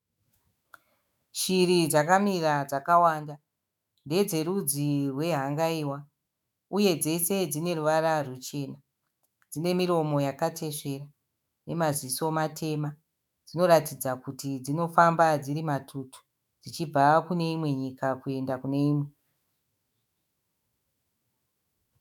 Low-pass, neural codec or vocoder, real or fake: 19.8 kHz; autoencoder, 48 kHz, 128 numbers a frame, DAC-VAE, trained on Japanese speech; fake